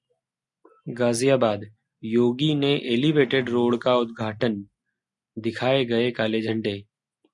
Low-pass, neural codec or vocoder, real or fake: 10.8 kHz; none; real